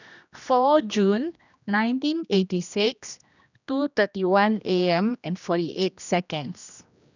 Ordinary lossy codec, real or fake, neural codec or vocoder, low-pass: none; fake; codec, 16 kHz, 1 kbps, X-Codec, HuBERT features, trained on general audio; 7.2 kHz